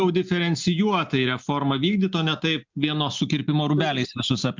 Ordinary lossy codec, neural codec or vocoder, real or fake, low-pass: MP3, 64 kbps; none; real; 7.2 kHz